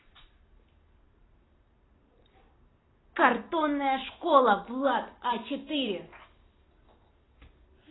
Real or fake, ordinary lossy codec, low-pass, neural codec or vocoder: fake; AAC, 16 kbps; 7.2 kHz; vocoder, 44.1 kHz, 128 mel bands every 256 samples, BigVGAN v2